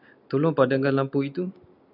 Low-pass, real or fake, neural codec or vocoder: 5.4 kHz; real; none